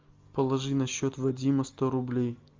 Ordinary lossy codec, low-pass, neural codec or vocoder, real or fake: Opus, 32 kbps; 7.2 kHz; none; real